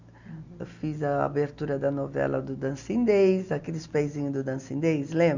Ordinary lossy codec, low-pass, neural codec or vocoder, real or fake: Opus, 64 kbps; 7.2 kHz; none; real